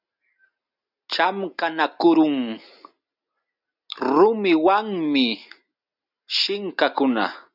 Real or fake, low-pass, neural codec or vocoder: real; 5.4 kHz; none